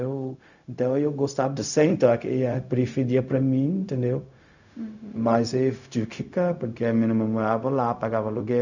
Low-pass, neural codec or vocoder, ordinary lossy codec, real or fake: 7.2 kHz; codec, 16 kHz, 0.4 kbps, LongCat-Audio-Codec; none; fake